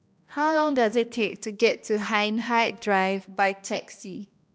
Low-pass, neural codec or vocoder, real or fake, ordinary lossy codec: none; codec, 16 kHz, 1 kbps, X-Codec, HuBERT features, trained on balanced general audio; fake; none